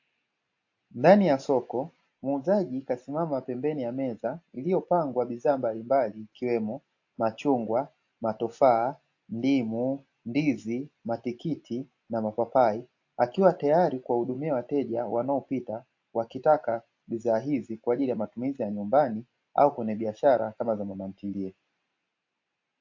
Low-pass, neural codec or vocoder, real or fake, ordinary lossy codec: 7.2 kHz; none; real; AAC, 48 kbps